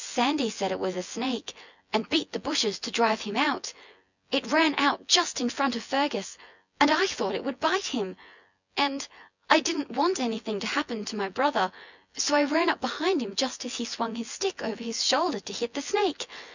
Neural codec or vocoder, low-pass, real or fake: vocoder, 24 kHz, 100 mel bands, Vocos; 7.2 kHz; fake